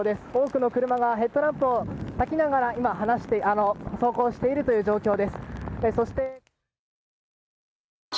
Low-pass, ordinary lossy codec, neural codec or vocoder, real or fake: none; none; none; real